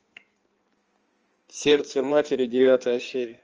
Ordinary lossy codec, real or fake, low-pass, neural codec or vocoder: Opus, 24 kbps; fake; 7.2 kHz; codec, 16 kHz in and 24 kHz out, 1.1 kbps, FireRedTTS-2 codec